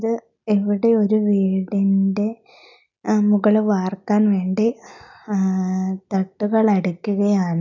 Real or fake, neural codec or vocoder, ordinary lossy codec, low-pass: real; none; none; 7.2 kHz